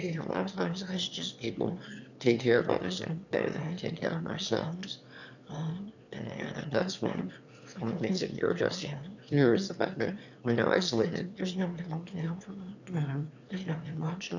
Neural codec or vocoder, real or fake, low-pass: autoencoder, 22.05 kHz, a latent of 192 numbers a frame, VITS, trained on one speaker; fake; 7.2 kHz